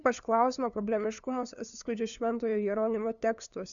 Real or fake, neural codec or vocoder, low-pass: fake; codec, 16 kHz, 2 kbps, FunCodec, trained on LibriTTS, 25 frames a second; 7.2 kHz